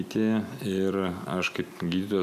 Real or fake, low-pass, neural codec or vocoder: real; 14.4 kHz; none